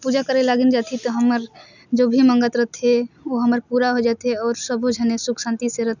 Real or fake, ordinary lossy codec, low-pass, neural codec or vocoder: real; none; 7.2 kHz; none